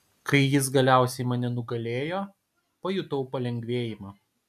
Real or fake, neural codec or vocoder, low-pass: real; none; 14.4 kHz